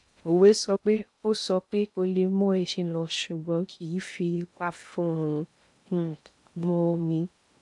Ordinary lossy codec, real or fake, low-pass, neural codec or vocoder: AAC, 64 kbps; fake; 10.8 kHz; codec, 16 kHz in and 24 kHz out, 0.6 kbps, FocalCodec, streaming, 2048 codes